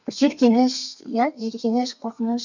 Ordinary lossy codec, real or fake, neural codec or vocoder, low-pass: none; fake; codec, 32 kHz, 1.9 kbps, SNAC; 7.2 kHz